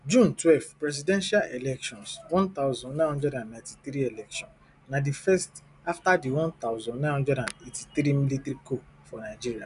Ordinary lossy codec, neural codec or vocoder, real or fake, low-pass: none; none; real; 10.8 kHz